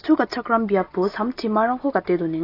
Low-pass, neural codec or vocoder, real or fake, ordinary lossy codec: 5.4 kHz; none; real; AAC, 32 kbps